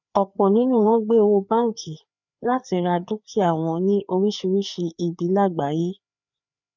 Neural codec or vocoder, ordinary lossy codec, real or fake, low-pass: codec, 16 kHz, 4 kbps, FreqCodec, larger model; none; fake; 7.2 kHz